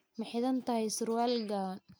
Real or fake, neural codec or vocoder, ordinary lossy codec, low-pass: real; none; none; none